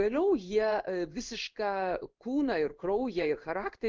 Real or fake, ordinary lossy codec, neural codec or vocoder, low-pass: fake; Opus, 32 kbps; codec, 16 kHz in and 24 kHz out, 1 kbps, XY-Tokenizer; 7.2 kHz